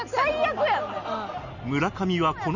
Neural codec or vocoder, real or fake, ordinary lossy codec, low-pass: none; real; none; 7.2 kHz